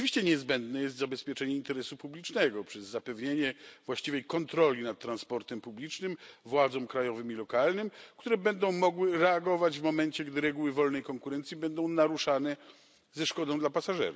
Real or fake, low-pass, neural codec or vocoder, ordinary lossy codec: real; none; none; none